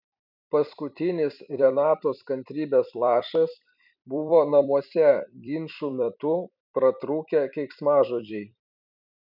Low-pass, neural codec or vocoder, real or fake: 5.4 kHz; vocoder, 44.1 kHz, 128 mel bands every 256 samples, BigVGAN v2; fake